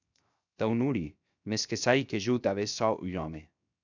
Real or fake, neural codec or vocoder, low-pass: fake; codec, 16 kHz, 0.7 kbps, FocalCodec; 7.2 kHz